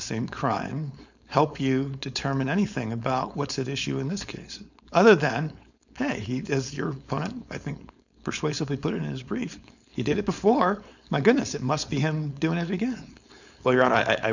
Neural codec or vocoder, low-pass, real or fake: codec, 16 kHz, 4.8 kbps, FACodec; 7.2 kHz; fake